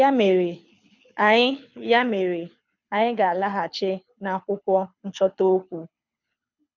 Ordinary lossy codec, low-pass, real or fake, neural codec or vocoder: none; 7.2 kHz; fake; codec, 24 kHz, 6 kbps, HILCodec